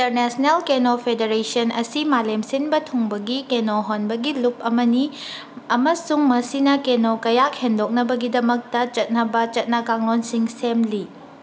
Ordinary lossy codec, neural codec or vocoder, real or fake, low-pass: none; none; real; none